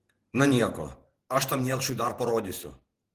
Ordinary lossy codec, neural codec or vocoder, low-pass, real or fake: Opus, 16 kbps; vocoder, 48 kHz, 128 mel bands, Vocos; 14.4 kHz; fake